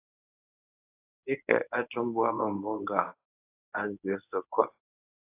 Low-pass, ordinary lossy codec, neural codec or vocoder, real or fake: 3.6 kHz; AAC, 24 kbps; codec, 24 kHz, 0.9 kbps, WavTokenizer, medium speech release version 1; fake